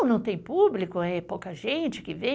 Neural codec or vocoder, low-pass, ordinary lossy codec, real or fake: none; none; none; real